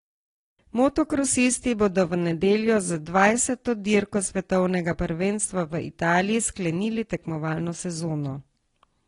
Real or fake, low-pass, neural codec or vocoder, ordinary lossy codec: real; 10.8 kHz; none; AAC, 32 kbps